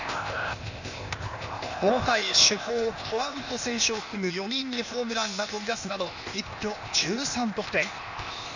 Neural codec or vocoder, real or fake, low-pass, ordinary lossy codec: codec, 16 kHz, 0.8 kbps, ZipCodec; fake; 7.2 kHz; none